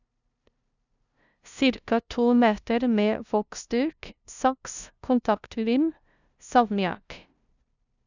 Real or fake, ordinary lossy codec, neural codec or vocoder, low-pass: fake; none; codec, 16 kHz, 0.5 kbps, FunCodec, trained on LibriTTS, 25 frames a second; 7.2 kHz